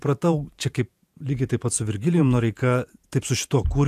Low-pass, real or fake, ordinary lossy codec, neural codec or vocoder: 14.4 kHz; fake; AAC, 96 kbps; vocoder, 48 kHz, 128 mel bands, Vocos